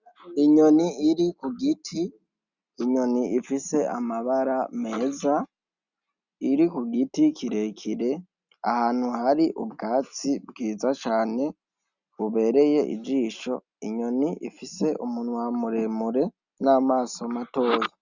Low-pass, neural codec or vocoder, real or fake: 7.2 kHz; none; real